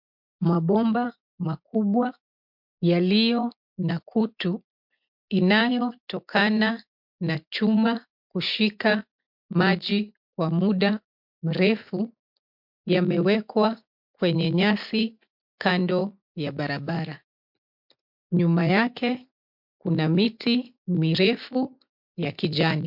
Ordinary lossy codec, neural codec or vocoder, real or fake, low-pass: MP3, 48 kbps; none; real; 5.4 kHz